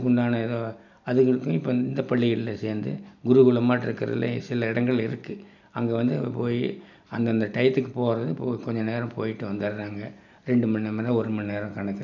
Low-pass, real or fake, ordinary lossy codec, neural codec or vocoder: 7.2 kHz; real; none; none